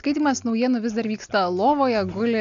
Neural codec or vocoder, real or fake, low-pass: none; real; 7.2 kHz